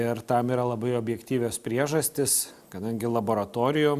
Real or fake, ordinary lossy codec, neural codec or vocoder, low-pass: real; Opus, 64 kbps; none; 14.4 kHz